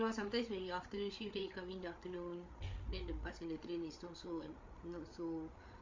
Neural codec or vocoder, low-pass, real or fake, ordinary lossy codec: codec, 16 kHz, 8 kbps, FreqCodec, larger model; 7.2 kHz; fake; MP3, 48 kbps